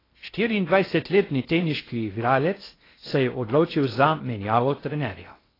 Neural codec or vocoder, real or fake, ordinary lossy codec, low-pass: codec, 16 kHz in and 24 kHz out, 0.6 kbps, FocalCodec, streaming, 4096 codes; fake; AAC, 24 kbps; 5.4 kHz